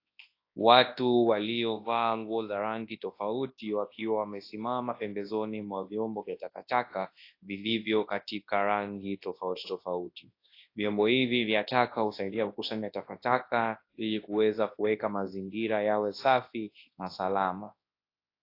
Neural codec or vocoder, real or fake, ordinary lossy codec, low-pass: codec, 24 kHz, 0.9 kbps, WavTokenizer, large speech release; fake; AAC, 32 kbps; 5.4 kHz